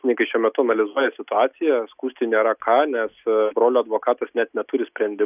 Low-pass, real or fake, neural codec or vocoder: 3.6 kHz; real; none